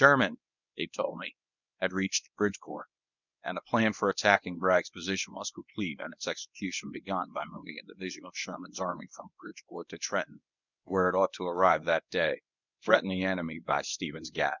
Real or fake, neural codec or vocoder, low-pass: fake; codec, 24 kHz, 0.9 kbps, WavTokenizer, medium speech release version 1; 7.2 kHz